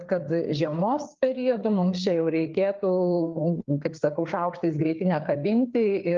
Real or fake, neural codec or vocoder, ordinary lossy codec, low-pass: fake; codec, 16 kHz, 4 kbps, FreqCodec, larger model; Opus, 32 kbps; 7.2 kHz